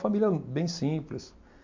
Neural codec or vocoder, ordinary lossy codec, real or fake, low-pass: none; MP3, 64 kbps; real; 7.2 kHz